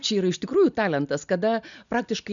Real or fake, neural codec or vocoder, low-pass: real; none; 7.2 kHz